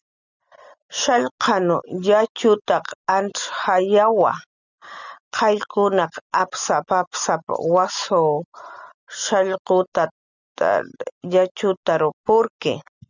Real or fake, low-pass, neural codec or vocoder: real; 7.2 kHz; none